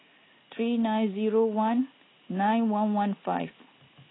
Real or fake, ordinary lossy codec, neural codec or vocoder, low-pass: real; AAC, 16 kbps; none; 7.2 kHz